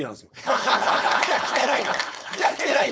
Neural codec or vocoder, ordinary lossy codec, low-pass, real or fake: codec, 16 kHz, 4.8 kbps, FACodec; none; none; fake